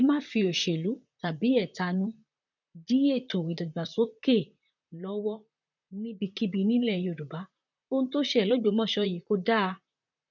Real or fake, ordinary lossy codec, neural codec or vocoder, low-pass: fake; none; vocoder, 44.1 kHz, 128 mel bands, Pupu-Vocoder; 7.2 kHz